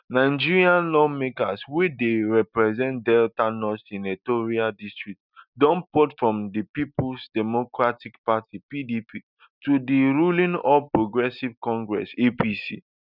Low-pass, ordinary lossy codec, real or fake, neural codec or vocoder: 5.4 kHz; none; real; none